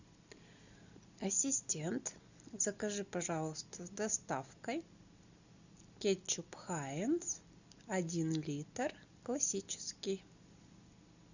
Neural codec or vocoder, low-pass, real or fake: none; 7.2 kHz; real